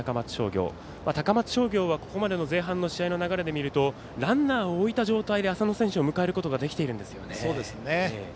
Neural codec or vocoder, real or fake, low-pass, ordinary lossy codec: none; real; none; none